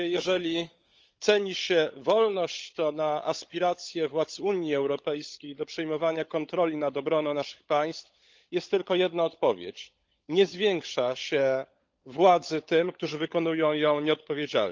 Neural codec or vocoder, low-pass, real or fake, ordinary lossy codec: codec, 16 kHz, 16 kbps, FunCodec, trained on LibriTTS, 50 frames a second; 7.2 kHz; fake; Opus, 24 kbps